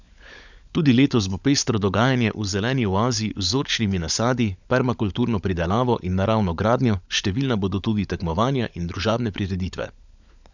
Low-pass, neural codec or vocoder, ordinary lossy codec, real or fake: 7.2 kHz; codec, 16 kHz, 16 kbps, FunCodec, trained on LibriTTS, 50 frames a second; none; fake